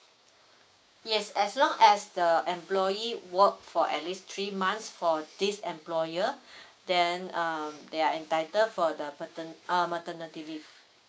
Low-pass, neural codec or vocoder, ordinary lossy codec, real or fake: none; codec, 16 kHz, 6 kbps, DAC; none; fake